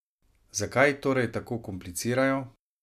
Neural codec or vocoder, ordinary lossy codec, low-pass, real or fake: none; MP3, 96 kbps; 14.4 kHz; real